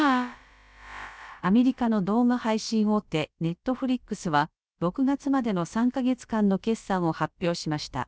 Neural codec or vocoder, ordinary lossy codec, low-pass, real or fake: codec, 16 kHz, about 1 kbps, DyCAST, with the encoder's durations; none; none; fake